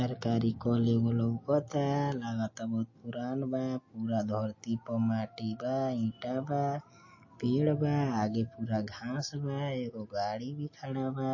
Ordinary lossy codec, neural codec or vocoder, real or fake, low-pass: MP3, 32 kbps; none; real; 7.2 kHz